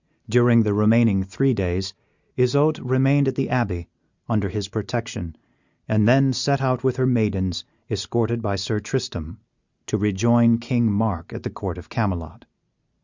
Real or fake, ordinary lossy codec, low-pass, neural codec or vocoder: real; Opus, 64 kbps; 7.2 kHz; none